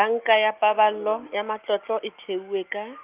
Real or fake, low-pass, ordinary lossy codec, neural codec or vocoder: real; 3.6 kHz; Opus, 24 kbps; none